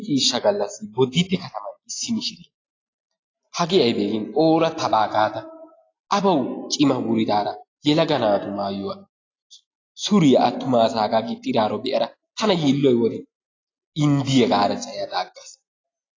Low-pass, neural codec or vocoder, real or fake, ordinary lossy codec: 7.2 kHz; none; real; AAC, 32 kbps